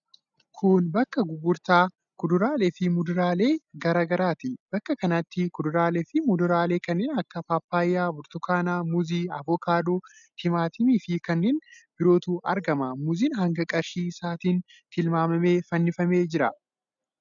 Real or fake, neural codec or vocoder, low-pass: real; none; 7.2 kHz